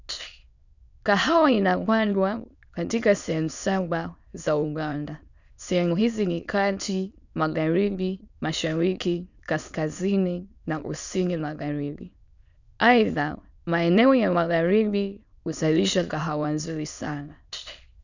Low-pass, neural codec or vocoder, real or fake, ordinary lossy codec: 7.2 kHz; autoencoder, 22.05 kHz, a latent of 192 numbers a frame, VITS, trained on many speakers; fake; none